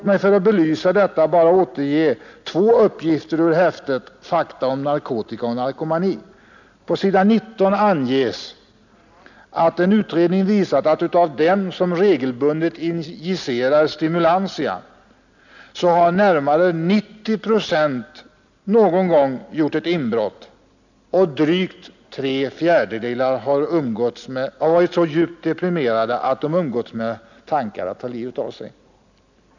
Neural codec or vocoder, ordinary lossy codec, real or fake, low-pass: none; none; real; 7.2 kHz